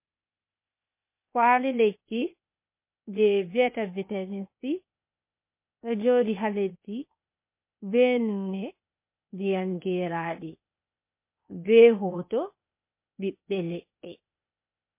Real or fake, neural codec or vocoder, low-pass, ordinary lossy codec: fake; codec, 16 kHz, 0.8 kbps, ZipCodec; 3.6 kHz; MP3, 24 kbps